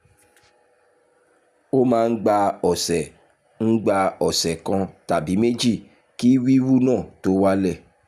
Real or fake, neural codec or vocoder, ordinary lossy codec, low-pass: real; none; none; 14.4 kHz